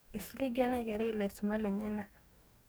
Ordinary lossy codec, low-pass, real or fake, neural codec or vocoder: none; none; fake; codec, 44.1 kHz, 2.6 kbps, DAC